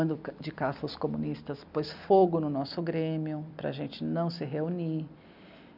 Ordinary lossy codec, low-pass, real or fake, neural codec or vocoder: none; 5.4 kHz; real; none